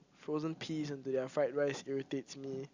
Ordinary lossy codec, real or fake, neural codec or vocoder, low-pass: Opus, 64 kbps; real; none; 7.2 kHz